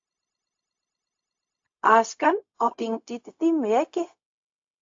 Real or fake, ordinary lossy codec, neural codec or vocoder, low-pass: fake; AAC, 48 kbps; codec, 16 kHz, 0.4 kbps, LongCat-Audio-Codec; 7.2 kHz